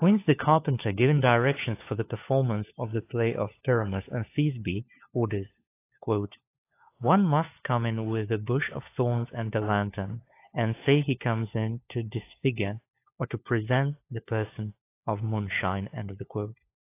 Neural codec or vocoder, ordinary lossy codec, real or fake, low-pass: codec, 16 kHz, 4 kbps, FunCodec, trained on LibriTTS, 50 frames a second; AAC, 24 kbps; fake; 3.6 kHz